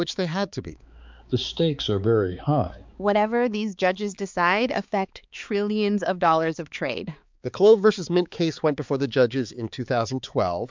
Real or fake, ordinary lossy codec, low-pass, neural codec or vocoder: fake; MP3, 64 kbps; 7.2 kHz; codec, 16 kHz, 4 kbps, X-Codec, HuBERT features, trained on balanced general audio